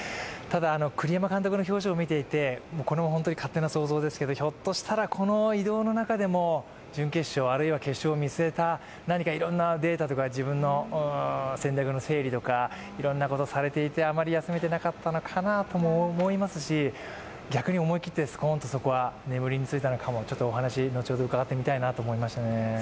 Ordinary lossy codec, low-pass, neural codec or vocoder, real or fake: none; none; none; real